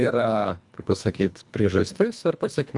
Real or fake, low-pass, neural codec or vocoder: fake; 10.8 kHz; codec, 24 kHz, 1.5 kbps, HILCodec